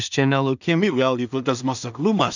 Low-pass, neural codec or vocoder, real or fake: 7.2 kHz; codec, 16 kHz in and 24 kHz out, 0.4 kbps, LongCat-Audio-Codec, two codebook decoder; fake